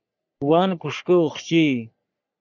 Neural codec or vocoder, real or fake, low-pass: codec, 44.1 kHz, 3.4 kbps, Pupu-Codec; fake; 7.2 kHz